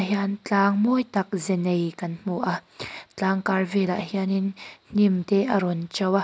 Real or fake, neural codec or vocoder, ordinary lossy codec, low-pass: real; none; none; none